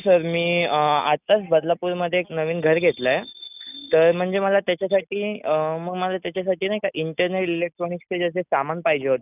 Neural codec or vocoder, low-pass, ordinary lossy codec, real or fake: none; 3.6 kHz; none; real